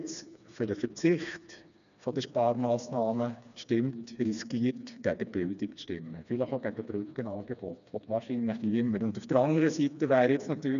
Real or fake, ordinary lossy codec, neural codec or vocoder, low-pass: fake; none; codec, 16 kHz, 2 kbps, FreqCodec, smaller model; 7.2 kHz